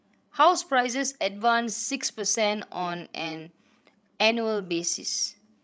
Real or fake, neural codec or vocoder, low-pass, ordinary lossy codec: fake; codec, 16 kHz, 16 kbps, FreqCodec, larger model; none; none